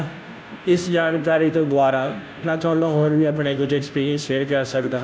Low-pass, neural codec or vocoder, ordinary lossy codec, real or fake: none; codec, 16 kHz, 0.5 kbps, FunCodec, trained on Chinese and English, 25 frames a second; none; fake